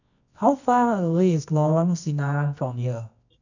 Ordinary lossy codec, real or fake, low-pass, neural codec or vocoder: none; fake; 7.2 kHz; codec, 24 kHz, 0.9 kbps, WavTokenizer, medium music audio release